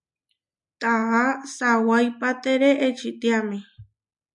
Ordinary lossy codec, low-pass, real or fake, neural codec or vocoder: MP3, 96 kbps; 10.8 kHz; real; none